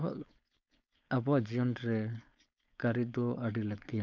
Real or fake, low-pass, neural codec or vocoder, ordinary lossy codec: fake; 7.2 kHz; codec, 16 kHz, 4.8 kbps, FACodec; none